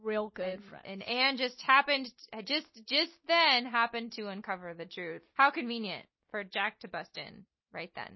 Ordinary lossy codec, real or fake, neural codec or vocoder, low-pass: MP3, 24 kbps; real; none; 7.2 kHz